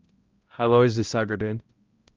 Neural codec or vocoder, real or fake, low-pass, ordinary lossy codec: codec, 16 kHz, 0.5 kbps, X-Codec, HuBERT features, trained on balanced general audio; fake; 7.2 kHz; Opus, 32 kbps